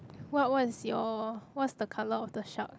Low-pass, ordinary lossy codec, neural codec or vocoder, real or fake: none; none; none; real